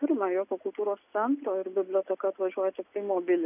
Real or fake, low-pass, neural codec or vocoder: real; 3.6 kHz; none